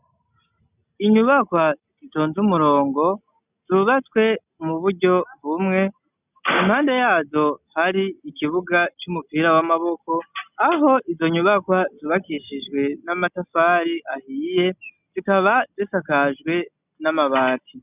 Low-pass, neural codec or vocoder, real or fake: 3.6 kHz; none; real